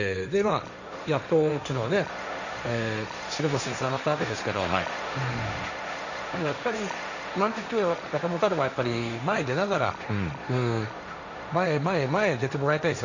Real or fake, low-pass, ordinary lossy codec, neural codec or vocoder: fake; 7.2 kHz; none; codec, 16 kHz, 1.1 kbps, Voila-Tokenizer